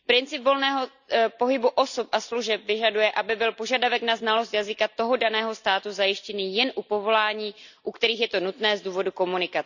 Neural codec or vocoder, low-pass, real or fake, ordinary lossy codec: none; 7.2 kHz; real; none